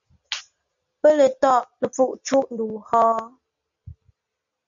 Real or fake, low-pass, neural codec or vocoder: real; 7.2 kHz; none